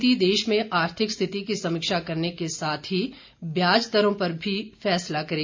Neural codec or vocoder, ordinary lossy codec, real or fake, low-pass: none; MP3, 64 kbps; real; 7.2 kHz